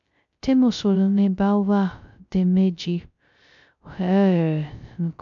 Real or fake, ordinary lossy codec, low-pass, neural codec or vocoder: fake; MP3, 64 kbps; 7.2 kHz; codec, 16 kHz, 0.2 kbps, FocalCodec